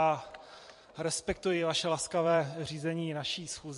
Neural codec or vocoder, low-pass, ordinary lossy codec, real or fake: vocoder, 44.1 kHz, 128 mel bands every 256 samples, BigVGAN v2; 14.4 kHz; MP3, 48 kbps; fake